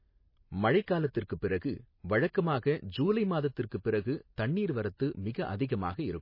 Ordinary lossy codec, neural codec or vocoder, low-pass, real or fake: MP3, 24 kbps; none; 5.4 kHz; real